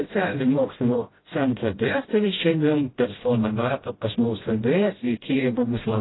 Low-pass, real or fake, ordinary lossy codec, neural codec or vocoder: 7.2 kHz; fake; AAC, 16 kbps; codec, 16 kHz, 0.5 kbps, FreqCodec, smaller model